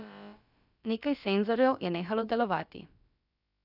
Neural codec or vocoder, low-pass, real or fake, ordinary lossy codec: codec, 16 kHz, about 1 kbps, DyCAST, with the encoder's durations; 5.4 kHz; fake; none